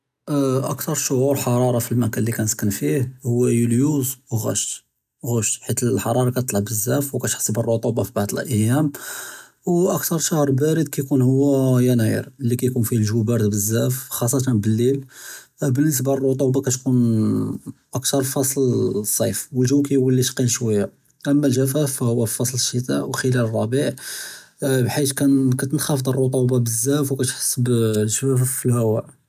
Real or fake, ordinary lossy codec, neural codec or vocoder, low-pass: real; none; none; 14.4 kHz